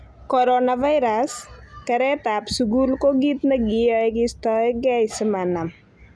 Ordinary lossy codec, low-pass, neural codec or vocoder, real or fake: none; none; none; real